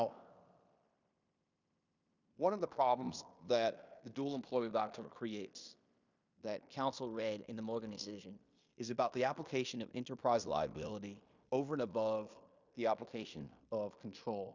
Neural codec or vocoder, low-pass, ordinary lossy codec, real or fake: codec, 16 kHz in and 24 kHz out, 0.9 kbps, LongCat-Audio-Codec, fine tuned four codebook decoder; 7.2 kHz; Opus, 64 kbps; fake